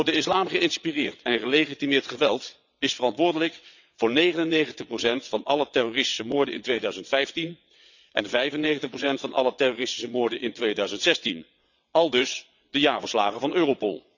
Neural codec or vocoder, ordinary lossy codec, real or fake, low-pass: vocoder, 22.05 kHz, 80 mel bands, WaveNeXt; none; fake; 7.2 kHz